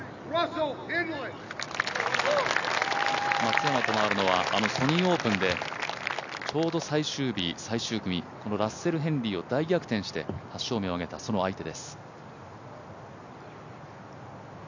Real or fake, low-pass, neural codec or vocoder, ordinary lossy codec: real; 7.2 kHz; none; none